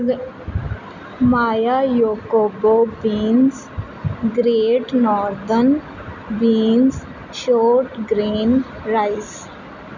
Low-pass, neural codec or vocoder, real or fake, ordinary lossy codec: 7.2 kHz; none; real; none